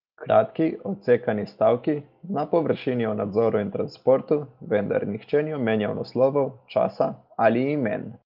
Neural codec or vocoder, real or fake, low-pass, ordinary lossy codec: none; real; 5.4 kHz; Opus, 32 kbps